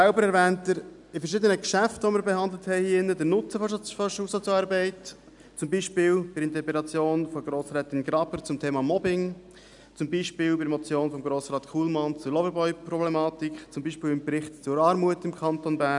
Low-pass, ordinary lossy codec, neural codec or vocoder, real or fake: 10.8 kHz; none; none; real